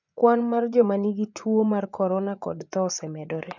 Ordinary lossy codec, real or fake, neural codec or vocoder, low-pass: none; fake; vocoder, 24 kHz, 100 mel bands, Vocos; 7.2 kHz